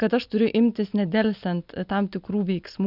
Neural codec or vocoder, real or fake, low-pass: none; real; 5.4 kHz